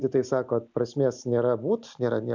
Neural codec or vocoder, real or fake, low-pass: none; real; 7.2 kHz